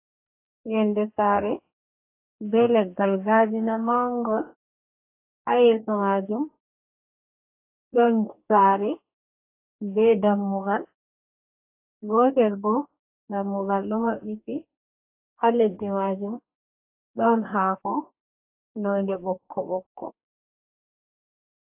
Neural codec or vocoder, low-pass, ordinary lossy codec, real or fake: codec, 44.1 kHz, 2.6 kbps, DAC; 3.6 kHz; AAC, 24 kbps; fake